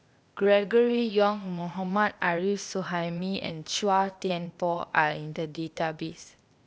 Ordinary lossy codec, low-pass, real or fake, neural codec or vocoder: none; none; fake; codec, 16 kHz, 0.8 kbps, ZipCodec